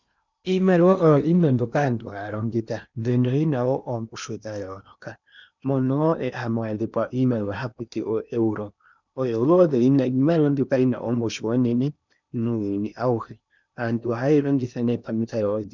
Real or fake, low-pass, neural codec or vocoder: fake; 7.2 kHz; codec, 16 kHz in and 24 kHz out, 0.8 kbps, FocalCodec, streaming, 65536 codes